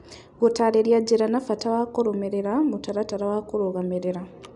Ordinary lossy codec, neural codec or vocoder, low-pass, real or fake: none; none; 10.8 kHz; real